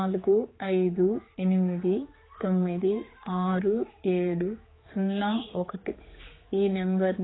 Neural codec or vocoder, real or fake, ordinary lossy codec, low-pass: codec, 16 kHz, 4 kbps, X-Codec, HuBERT features, trained on general audio; fake; AAC, 16 kbps; 7.2 kHz